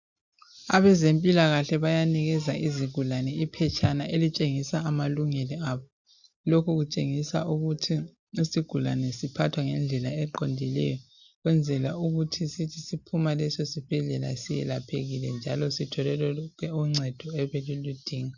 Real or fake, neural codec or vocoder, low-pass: real; none; 7.2 kHz